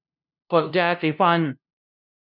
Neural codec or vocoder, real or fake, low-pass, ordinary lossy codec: codec, 16 kHz, 0.5 kbps, FunCodec, trained on LibriTTS, 25 frames a second; fake; 5.4 kHz; none